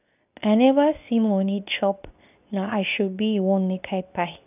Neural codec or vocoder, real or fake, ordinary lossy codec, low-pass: codec, 24 kHz, 0.9 kbps, WavTokenizer, medium speech release version 2; fake; none; 3.6 kHz